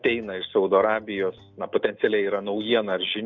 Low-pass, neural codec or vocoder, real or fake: 7.2 kHz; none; real